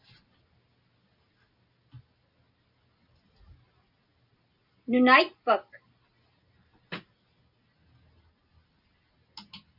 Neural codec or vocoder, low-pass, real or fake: none; 5.4 kHz; real